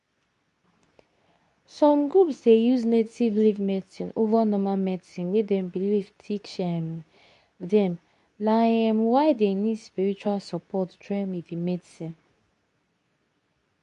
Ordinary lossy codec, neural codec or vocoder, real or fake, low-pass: MP3, 96 kbps; codec, 24 kHz, 0.9 kbps, WavTokenizer, medium speech release version 2; fake; 10.8 kHz